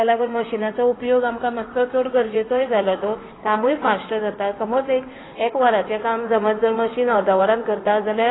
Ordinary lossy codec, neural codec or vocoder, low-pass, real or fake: AAC, 16 kbps; codec, 16 kHz in and 24 kHz out, 2.2 kbps, FireRedTTS-2 codec; 7.2 kHz; fake